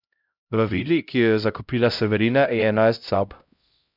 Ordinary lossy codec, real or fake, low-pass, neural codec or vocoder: none; fake; 5.4 kHz; codec, 16 kHz, 0.5 kbps, X-Codec, HuBERT features, trained on LibriSpeech